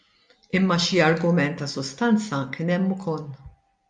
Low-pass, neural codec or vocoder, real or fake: 9.9 kHz; none; real